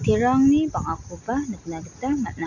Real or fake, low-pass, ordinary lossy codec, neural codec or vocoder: real; 7.2 kHz; none; none